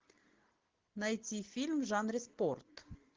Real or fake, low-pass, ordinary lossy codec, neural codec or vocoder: real; 7.2 kHz; Opus, 16 kbps; none